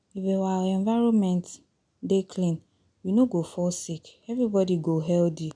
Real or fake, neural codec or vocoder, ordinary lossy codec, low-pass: real; none; none; 9.9 kHz